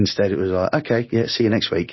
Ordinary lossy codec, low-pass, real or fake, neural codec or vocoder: MP3, 24 kbps; 7.2 kHz; real; none